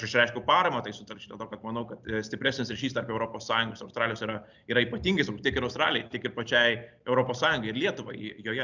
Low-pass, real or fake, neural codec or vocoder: 7.2 kHz; real; none